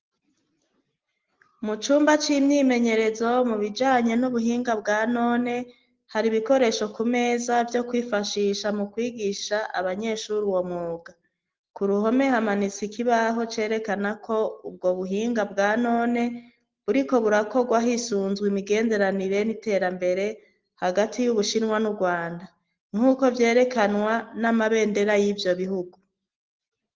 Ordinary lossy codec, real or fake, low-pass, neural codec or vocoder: Opus, 16 kbps; real; 7.2 kHz; none